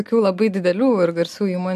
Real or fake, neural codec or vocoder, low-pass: real; none; 14.4 kHz